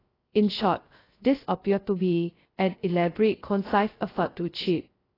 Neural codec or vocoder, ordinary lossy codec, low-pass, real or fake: codec, 16 kHz, 0.2 kbps, FocalCodec; AAC, 24 kbps; 5.4 kHz; fake